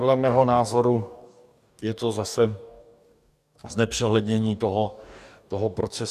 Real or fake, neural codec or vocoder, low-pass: fake; codec, 44.1 kHz, 2.6 kbps, DAC; 14.4 kHz